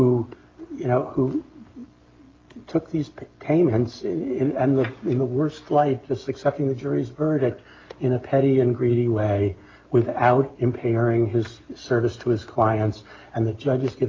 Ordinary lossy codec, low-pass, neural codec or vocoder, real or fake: Opus, 32 kbps; 7.2 kHz; none; real